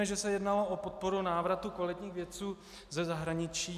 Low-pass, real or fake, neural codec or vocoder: 14.4 kHz; real; none